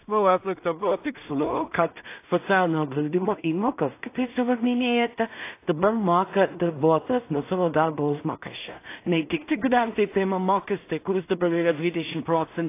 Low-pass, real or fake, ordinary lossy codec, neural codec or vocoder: 3.6 kHz; fake; AAC, 24 kbps; codec, 16 kHz in and 24 kHz out, 0.4 kbps, LongCat-Audio-Codec, two codebook decoder